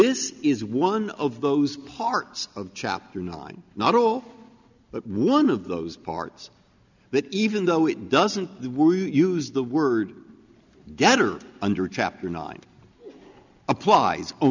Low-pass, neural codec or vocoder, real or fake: 7.2 kHz; none; real